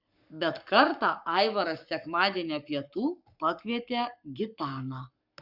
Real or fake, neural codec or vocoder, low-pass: fake; codec, 44.1 kHz, 7.8 kbps, Pupu-Codec; 5.4 kHz